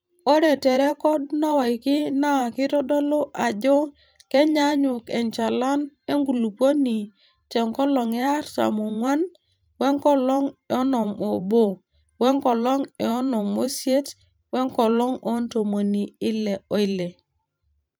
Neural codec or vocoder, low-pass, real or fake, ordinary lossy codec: vocoder, 44.1 kHz, 128 mel bands every 512 samples, BigVGAN v2; none; fake; none